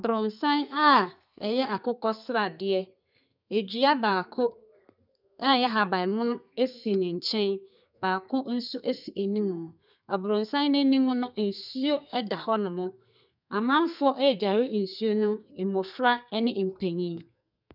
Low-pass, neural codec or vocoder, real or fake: 5.4 kHz; codec, 32 kHz, 1.9 kbps, SNAC; fake